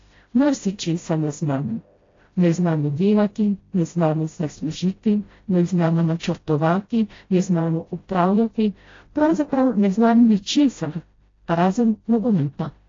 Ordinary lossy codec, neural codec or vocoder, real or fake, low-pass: AAC, 32 kbps; codec, 16 kHz, 0.5 kbps, FreqCodec, smaller model; fake; 7.2 kHz